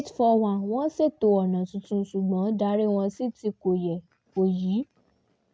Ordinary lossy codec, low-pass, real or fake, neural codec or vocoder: none; none; real; none